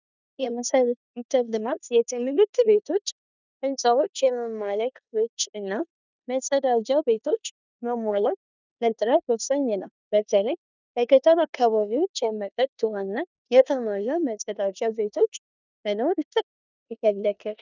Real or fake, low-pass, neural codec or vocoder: fake; 7.2 kHz; codec, 16 kHz in and 24 kHz out, 0.9 kbps, LongCat-Audio-Codec, four codebook decoder